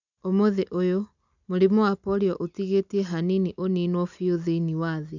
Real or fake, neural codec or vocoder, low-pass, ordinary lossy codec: real; none; 7.2 kHz; none